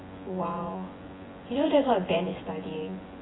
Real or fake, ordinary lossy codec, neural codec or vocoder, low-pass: fake; AAC, 16 kbps; vocoder, 24 kHz, 100 mel bands, Vocos; 7.2 kHz